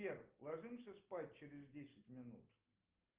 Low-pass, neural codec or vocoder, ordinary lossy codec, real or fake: 3.6 kHz; none; Opus, 32 kbps; real